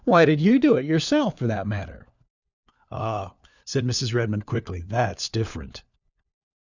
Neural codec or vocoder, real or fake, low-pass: codec, 16 kHz, 4 kbps, FunCodec, trained on LibriTTS, 50 frames a second; fake; 7.2 kHz